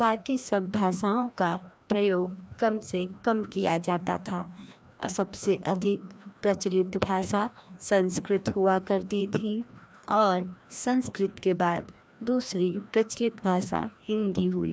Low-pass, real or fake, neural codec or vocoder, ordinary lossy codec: none; fake; codec, 16 kHz, 1 kbps, FreqCodec, larger model; none